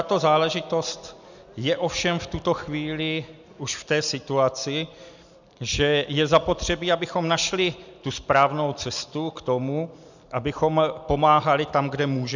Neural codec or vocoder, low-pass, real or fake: none; 7.2 kHz; real